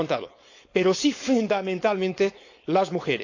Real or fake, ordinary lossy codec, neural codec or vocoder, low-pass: fake; AAC, 48 kbps; codec, 16 kHz, 4.8 kbps, FACodec; 7.2 kHz